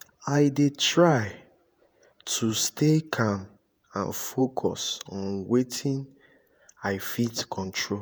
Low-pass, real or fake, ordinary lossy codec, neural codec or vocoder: none; fake; none; vocoder, 48 kHz, 128 mel bands, Vocos